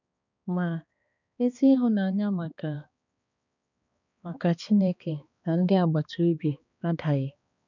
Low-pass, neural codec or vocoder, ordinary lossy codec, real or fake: 7.2 kHz; codec, 16 kHz, 2 kbps, X-Codec, HuBERT features, trained on balanced general audio; none; fake